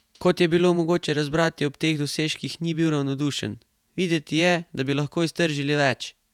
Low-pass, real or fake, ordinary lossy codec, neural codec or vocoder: 19.8 kHz; fake; none; vocoder, 48 kHz, 128 mel bands, Vocos